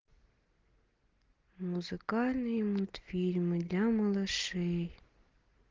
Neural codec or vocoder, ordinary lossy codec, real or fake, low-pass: none; Opus, 16 kbps; real; 7.2 kHz